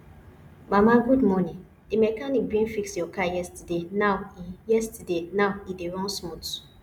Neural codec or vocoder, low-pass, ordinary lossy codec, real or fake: none; 19.8 kHz; none; real